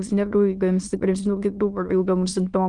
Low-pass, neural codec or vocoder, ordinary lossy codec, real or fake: 9.9 kHz; autoencoder, 22.05 kHz, a latent of 192 numbers a frame, VITS, trained on many speakers; Opus, 24 kbps; fake